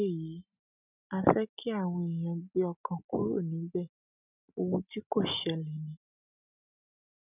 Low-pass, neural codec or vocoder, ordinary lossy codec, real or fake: 3.6 kHz; none; none; real